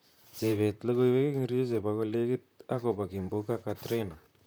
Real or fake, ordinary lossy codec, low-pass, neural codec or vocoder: fake; none; none; vocoder, 44.1 kHz, 128 mel bands, Pupu-Vocoder